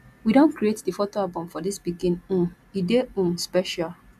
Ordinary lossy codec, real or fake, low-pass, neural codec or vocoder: none; real; 14.4 kHz; none